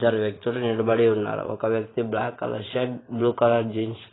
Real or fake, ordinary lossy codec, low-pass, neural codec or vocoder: fake; AAC, 16 kbps; 7.2 kHz; vocoder, 44.1 kHz, 128 mel bands every 512 samples, BigVGAN v2